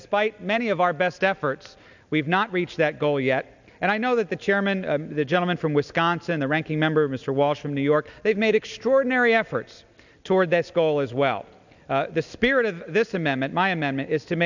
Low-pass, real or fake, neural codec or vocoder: 7.2 kHz; real; none